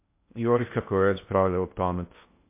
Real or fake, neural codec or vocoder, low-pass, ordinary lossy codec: fake; codec, 16 kHz in and 24 kHz out, 0.6 kbps, FocalCodec, streaming, 2048 codes; 3.6 kHz; MP3, 24 kbps